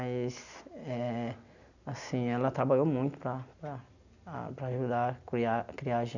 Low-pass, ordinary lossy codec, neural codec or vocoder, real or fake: 7.2 kHz; none; none; real